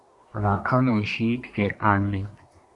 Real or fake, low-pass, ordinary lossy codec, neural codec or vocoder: fake; 10.8 kHz; Opus, 64 kbps; codec, 24 kHz, 1 kbps, SNAC